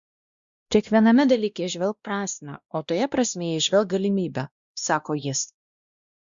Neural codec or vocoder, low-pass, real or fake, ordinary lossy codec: codec, 16 kHz, 1 kbps, X-Codec, WavLM features, trained on Multilingual LibriSpeech; 7.2 kHz; fake; Opus, 64 kbps